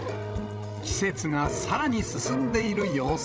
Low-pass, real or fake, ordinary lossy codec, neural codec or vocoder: none; fake; none; codec, 16 kHz, 16 kbps, FreqCodec, larger model